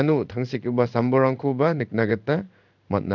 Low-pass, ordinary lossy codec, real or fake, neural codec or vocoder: 7.2 kHz; none; fake; codec, 16 kHz in and 24 kHz out, 1 kbps, XY-Tokenizer